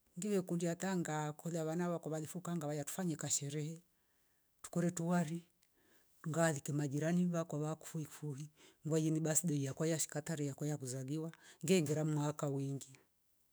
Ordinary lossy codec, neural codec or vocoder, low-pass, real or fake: none; autoencoder, 48 kHz, 128 numbers a frame, DAC-VAE, trained on Japanese speech; none; fake